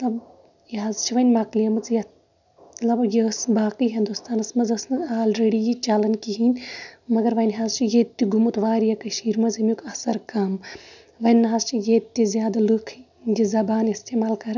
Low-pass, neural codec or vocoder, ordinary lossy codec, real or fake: 7.2 kHz; none; none; real